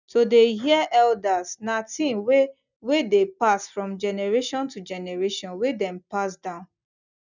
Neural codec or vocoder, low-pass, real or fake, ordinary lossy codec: none; 7.2 kHz; real; none